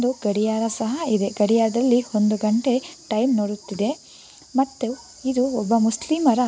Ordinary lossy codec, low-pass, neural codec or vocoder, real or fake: none; none; none; real